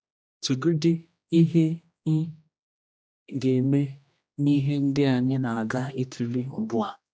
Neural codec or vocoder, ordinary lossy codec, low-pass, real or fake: codec, 16 kHz, 1 kbps, X-Codec, HuBERT features, trained on general audio; none; none; fake